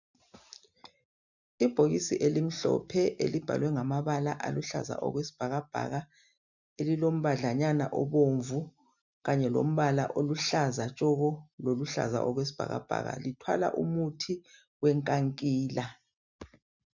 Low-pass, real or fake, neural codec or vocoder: 7.2 kHz; real; none